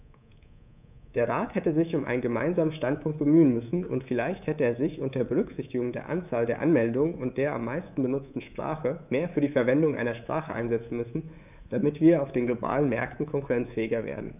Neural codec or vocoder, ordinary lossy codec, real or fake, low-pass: codec, 24 kHz, 3.1 kbps, DualCodec; none; fake; 3.6 kHz